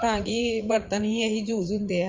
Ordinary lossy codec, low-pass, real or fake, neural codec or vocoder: Opus, 32 kbps; 7.2 kHz; fake; vocoder, 44.1 kHz, 80 mel bands, Vocos